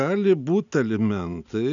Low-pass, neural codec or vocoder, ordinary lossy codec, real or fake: 7.2 kHz; none; MP3, 96 kbps; real